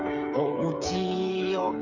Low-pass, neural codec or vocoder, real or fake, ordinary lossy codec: 7.2 kHz; codec, 16 kHz, 8 kbps, FreqCodec, smaller model; fake; none